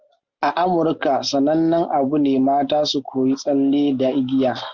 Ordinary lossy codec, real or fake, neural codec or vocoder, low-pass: Opus, 32 kbps; real; none; 7.2 kHz